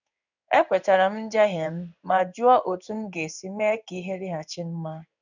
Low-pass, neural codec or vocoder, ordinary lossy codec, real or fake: 7.2 kHz; codec, 16 kHz in and 24 kHz out, 1 kbps, XY-Tokenizer; none; fake